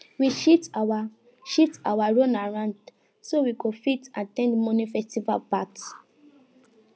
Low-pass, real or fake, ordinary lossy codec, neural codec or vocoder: none; real; none; none